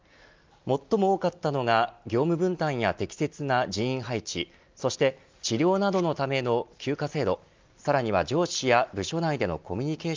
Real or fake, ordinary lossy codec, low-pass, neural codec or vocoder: real; Opus, 24 kbps; 7.2 kHz; none